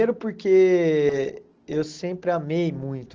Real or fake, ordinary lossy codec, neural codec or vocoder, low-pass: real; Opus, 16 kbps; none; 7.2 kHz